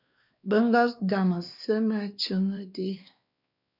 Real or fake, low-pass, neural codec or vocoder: fake; 5.4 kHz; codec, 16 kHz, 2 kbps, X-Codec, WavLM features, trained on Multilingual LibriSpeech